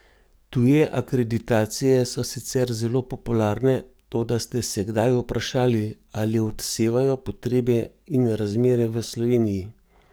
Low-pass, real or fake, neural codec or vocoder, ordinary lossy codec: none; fake; codec, 44.1 kHz, 7.8 kbps, DAC; none